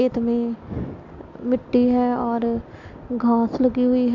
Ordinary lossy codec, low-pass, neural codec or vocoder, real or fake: MP3, 64 kbps; 7.2 kHz; none; real